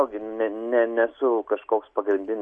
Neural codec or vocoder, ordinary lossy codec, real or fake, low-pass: none; MP3, 48 kbps; real; 9.9 kHz